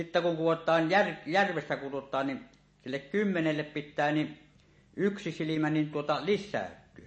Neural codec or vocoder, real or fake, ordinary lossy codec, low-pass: none; real; MP3, 32 kbps; 9.9 kHz